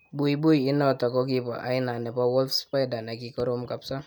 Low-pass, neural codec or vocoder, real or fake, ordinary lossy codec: none; none; real; none